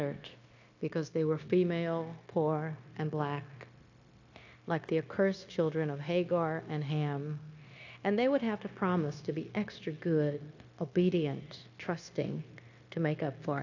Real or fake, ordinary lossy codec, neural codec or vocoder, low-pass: fake; AAC, 48 kbps; codec, 16 kHz, 0.9 kbps, LongCat-Audio-Codec; 7.2 kHz